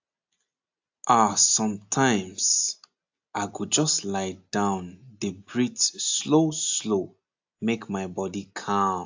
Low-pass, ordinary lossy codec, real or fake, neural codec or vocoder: 7.2 kHz; AAC, 48 kbps; real; none